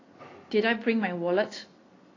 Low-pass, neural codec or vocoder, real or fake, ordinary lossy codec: 7.2 kHz; none; real; AAC, 32 kbps